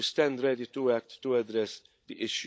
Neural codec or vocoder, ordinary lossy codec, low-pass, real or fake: codec, 16 kHz, 16 kbps, FunCodec, trained on LibriTTS, 50 frames a second; none; none; fake